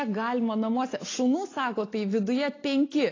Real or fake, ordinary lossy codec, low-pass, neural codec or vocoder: real; AAC, 32 kbps; 7.2 kHz; none